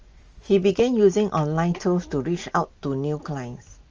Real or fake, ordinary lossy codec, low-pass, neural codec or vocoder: real; Opus, 24 kbps; 7.2 kHz; none